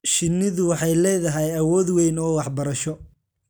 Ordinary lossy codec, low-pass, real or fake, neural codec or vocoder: none; none; real; none